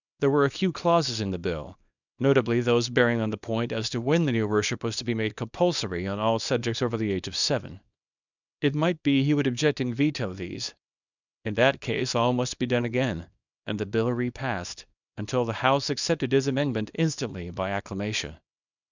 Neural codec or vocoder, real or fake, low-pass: codec, 24 kHz, 0.9 kbps, WavTokenizer, small release; fake; 7.2 kHz